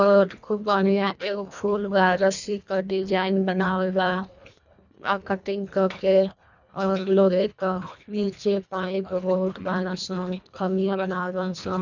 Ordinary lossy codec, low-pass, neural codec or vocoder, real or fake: none; 7.2 kHz; codec, 24 kHz, 1.5 kbps, HILCodec; fake